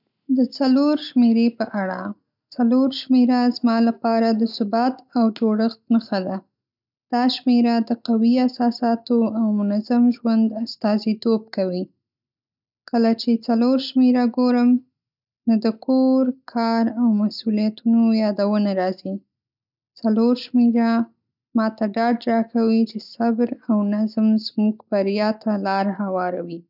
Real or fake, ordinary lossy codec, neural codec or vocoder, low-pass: real; none; none; 5.4 kHz